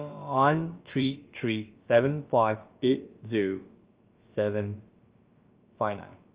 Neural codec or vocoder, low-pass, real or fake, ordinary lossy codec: codec, 16 kHz, about 1 kbps, DyCAST, with the encoder's durations; 3.6 kHz; fake; Opus, 24 kbps